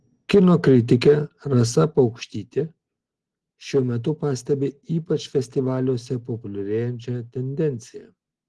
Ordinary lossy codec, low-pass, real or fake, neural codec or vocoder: Opus, 16 kbps; 10.8 kHz; real; none